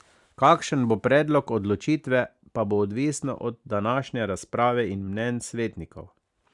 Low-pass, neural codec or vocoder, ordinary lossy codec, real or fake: 10.8 kHz; none; Opus, 64 kbps; real